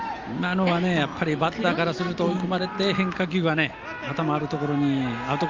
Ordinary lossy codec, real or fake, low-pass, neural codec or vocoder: Opus, 32 kbps; real; 7.2 kHz; none